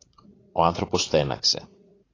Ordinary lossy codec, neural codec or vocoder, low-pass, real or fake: AAC, 32 kbps; vocoder, 44.1 kHz, 80 mel bands, Vocos; 7.2 kHz; fake